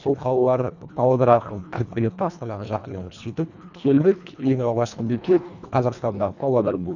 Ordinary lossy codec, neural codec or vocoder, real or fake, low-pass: none; codec, 24 kHz, 1.5 kbps, HILCodec; fake; 7.2 kHz